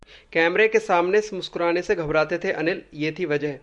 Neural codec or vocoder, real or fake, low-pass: none; real; 10.8 kHz